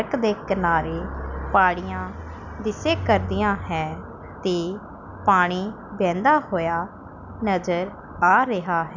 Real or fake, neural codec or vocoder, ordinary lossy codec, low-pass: real; none; none; 7.2 kHz